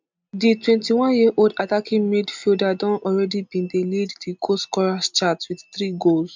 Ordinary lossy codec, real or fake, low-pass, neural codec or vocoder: MP3, 64 kbps; real; 7.2 kHz; none